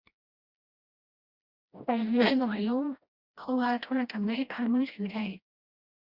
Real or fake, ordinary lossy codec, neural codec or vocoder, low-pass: fake; Opus, 64 kbps; codec, 16 kHz, 1 kbps, FreqCodec, smaller model; 5.4 kHz